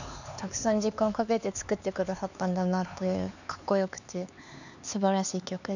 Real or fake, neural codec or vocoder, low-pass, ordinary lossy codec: fake; codec, 16 kHz, 4 kbps, X-Codec, HuBERT features, trained on LibriSpeech; 7.2 kHz; none